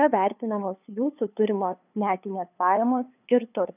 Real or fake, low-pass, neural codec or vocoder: fake; 3.6 kHz; codec, 16 kHz, 2 kbps, FunCodec, trained on LibriTTS, 25 frames a second